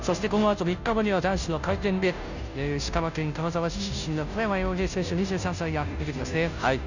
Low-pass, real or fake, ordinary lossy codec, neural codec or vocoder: 7.2 kHz; fake; none; codec, 16 kHz, 0.5 kbps, FunCodec, trained on Chinese and English, 25 frames a second